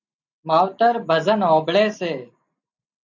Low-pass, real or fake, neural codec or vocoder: 7.2 kHz; real; none